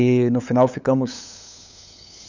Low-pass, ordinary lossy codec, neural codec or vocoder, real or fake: 7.2 kHz; none; codec, 16 kHz, 8 kbps, FunCodec, trained on LibriTTS, 25 frames a second; fake